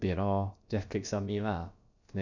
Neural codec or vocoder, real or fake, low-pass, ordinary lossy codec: codec, 16 kHz, about 1 kbps, DyCAST, with the encoder's durations; fake; 7.2 kHz; none